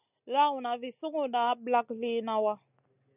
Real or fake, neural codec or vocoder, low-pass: real; none; 3.6 kHz